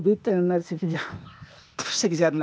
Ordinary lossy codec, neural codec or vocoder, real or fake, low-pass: none; codec, 16 kHz, 0.8 kbps, ZipCodec; fake; none